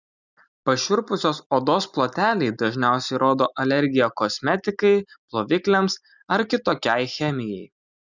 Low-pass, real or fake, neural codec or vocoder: 7.2 kHz; real; none